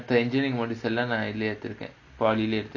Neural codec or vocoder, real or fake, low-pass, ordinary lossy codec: none; real; 7.2 kHz; none